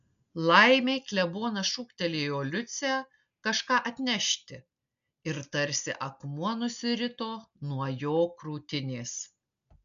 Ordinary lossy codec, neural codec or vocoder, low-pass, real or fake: AAC, 96 kbps; none; 7.2 kHz; real